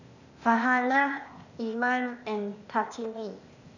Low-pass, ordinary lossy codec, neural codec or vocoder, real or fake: 7.2 kHz; none; codec, 16 kHz, 0.8 kbps, ZipCodec; fake